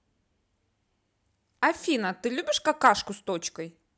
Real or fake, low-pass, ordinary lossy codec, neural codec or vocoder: real; none; none; none